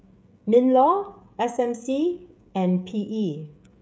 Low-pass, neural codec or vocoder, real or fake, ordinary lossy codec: none; codec, 16 kHz, 16 kbps, FreqCodec, smaller model; fake; none